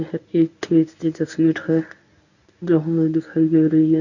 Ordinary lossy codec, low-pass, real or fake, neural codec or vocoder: none; 7.2 kHz; fake; codec, 24 kHz, 0.9 kbps, WavTokenizer, medium speech release version 1